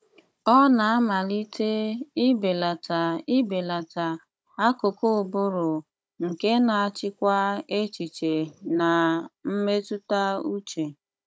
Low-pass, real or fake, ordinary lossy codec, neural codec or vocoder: none; fake; none; codec, 16 kHz, 16 kbps, FunCodec, trained on Chinese and English, 50 frames a second